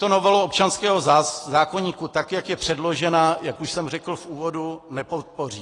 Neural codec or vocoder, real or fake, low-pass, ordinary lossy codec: none; real; 10.8 kHz; AAC, 32 kbps